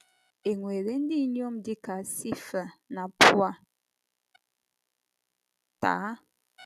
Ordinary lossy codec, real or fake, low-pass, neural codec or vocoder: none; real; 14.4 kHz; none